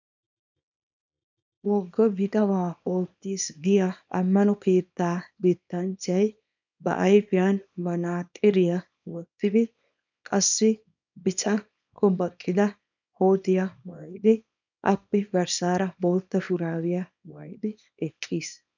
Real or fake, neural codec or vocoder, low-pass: fake; codec, 24 kHz, 0.9 kbps, WavTokenizer, small release; 7.2 kHz